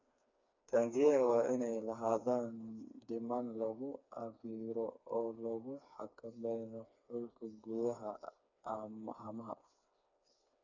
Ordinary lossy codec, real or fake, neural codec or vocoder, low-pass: none; fake; codec, 16 kHz, 4 kbps, FreqCodec, smaller model; 7.2 kHz